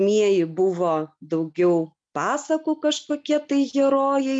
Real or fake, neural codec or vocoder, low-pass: real; none; 9.9 kHz